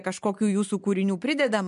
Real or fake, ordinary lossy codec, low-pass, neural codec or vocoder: real; MP3, 64 kbps; 10.8 kHz; none